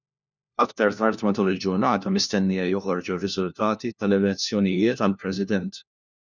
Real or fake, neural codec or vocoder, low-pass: fake; codec, 16 kHz, 1 kbps, FunCodec, trained on LibriTTS, 50 frames a second; 7.2 kHz